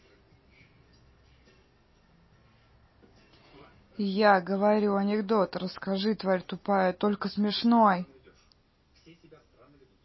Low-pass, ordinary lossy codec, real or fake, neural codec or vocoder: 7.2 kHz; MP3, 24 kbps; real; none